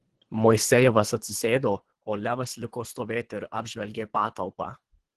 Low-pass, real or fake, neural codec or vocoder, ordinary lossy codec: 10.8 kHz; fake; codec, 24 kHz, 3 kbps, HILCodec; Opus, 16 kbps